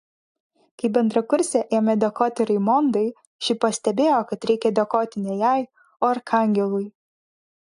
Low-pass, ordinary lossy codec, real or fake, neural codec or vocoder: 10.8 kHz; AAC, 64 kbps; real; none